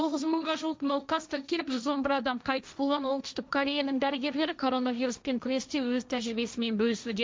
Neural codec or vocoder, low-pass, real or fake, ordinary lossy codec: codec, 16 kHz, 1.1 kbps, Voila-Tokenizer; none; fake; none